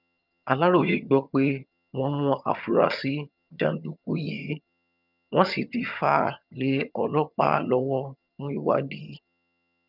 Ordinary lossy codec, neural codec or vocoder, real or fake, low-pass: none; vocoder, 22.05 kHz, 80 mel bands, HiFi-GAN; fake; 5.4 kHz